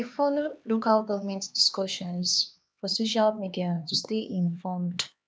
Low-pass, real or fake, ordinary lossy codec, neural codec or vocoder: none; fake; none; codec, 16 kHz, 2 kbps, X-Codec, HuBERT features, trained on LibriSpeech